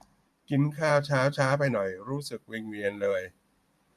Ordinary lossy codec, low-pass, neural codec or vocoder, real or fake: MP3, 64 kbps; 14.4 kHz; vocoder, 44.1 kHz, 128 mel bands every 512 samples, BigVGAN v2; fake